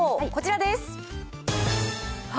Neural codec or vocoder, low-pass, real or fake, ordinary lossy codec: none; none; real; none